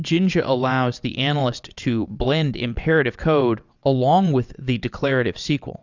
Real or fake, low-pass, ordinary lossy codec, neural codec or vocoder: fake; 7.2 kHz; Opus, 64 kbps; vocoder, 22.05 kHz, 80 mel bands, WaveNeXt